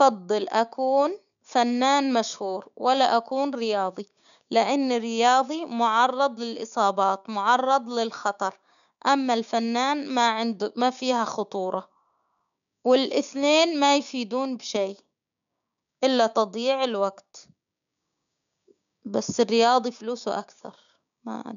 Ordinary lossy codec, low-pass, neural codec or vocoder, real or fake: none; 7.2 kHz; codec, 16 kHz, 6 kbps, DAC; fake